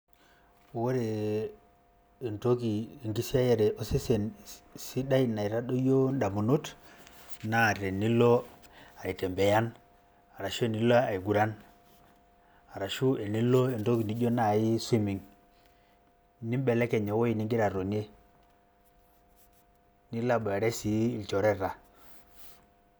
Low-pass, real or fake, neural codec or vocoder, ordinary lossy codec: none; real; none; none